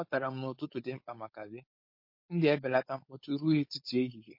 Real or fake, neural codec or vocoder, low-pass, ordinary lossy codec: fake; codec, 16 kHz, 4.8 kbps, FACodec; 5.4 kHz; MP3, 32 kbps